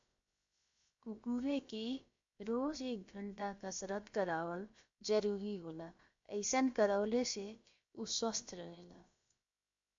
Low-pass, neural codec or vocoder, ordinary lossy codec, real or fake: 7.2 kHz; codec, 16 kHz, 0.7 kbps, FocalCodec; MP3, 48 kbps; fake